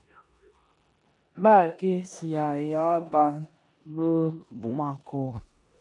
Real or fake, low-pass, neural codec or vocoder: fake; 10.8 kHz; codec, 16 kHz in and 24 kHz out, 0.9 kbps, LongCat-Audio-Codec, four codebook decoder